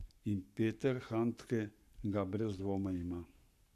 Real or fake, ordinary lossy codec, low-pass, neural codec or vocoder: fake; none; 14.4 kHz; codec, 44.1 kHz, 7.8 kbps, Pupu-Codec